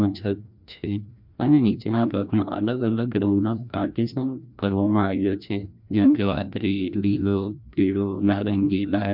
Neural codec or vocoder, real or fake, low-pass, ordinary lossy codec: codec, 16 kHz, 1 kbps, FreqCodec, larger model; fake; 5.4 kHz; MP3, 48 kbps